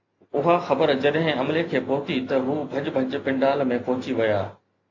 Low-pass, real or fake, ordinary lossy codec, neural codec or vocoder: 7.2 kHz; real; AAC, 48 kbps; none